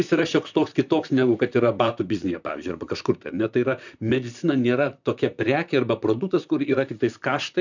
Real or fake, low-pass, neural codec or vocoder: fake; 7.2 kHz; vocoder, 44.1 kHz, 128 mel bands, Pupu-Vocoder